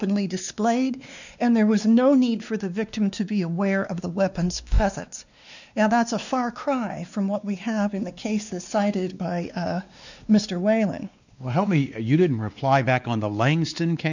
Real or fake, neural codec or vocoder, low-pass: fake; codec, 16 kHz, 2 kbps, X-Codec, WavLM features, trained on Multilingual LibriSpeech; 7.2 kHz